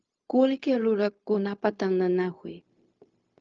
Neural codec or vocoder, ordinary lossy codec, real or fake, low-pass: codec, 16 kHz, 0.4 kbps, LongCat-Audio-Codec; Opus, 24 kbps; fake; 7.2 kHz